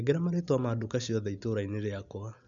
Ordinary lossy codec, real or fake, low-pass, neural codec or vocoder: none; real; 7.2 kHz; none